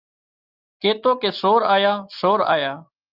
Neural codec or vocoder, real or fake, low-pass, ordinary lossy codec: none; real; 5.4 kHz; Opus, 24 kbps